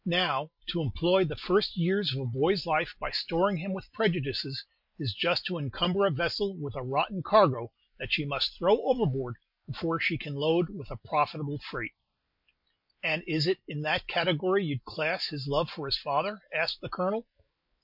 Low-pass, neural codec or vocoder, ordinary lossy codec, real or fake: 5.4 kHz; none; MP3, 48 kbps; real